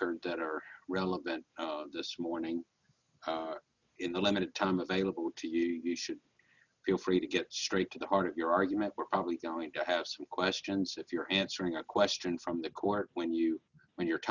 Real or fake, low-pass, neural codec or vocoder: real; 7.2 kHz; none